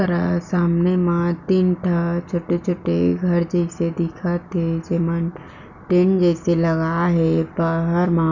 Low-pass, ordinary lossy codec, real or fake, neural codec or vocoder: 7.2 kHz; none; real; none